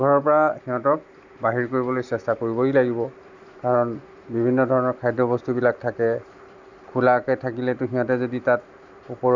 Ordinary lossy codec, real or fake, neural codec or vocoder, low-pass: Opus, 64 kbps; real; none; 7.2 kHz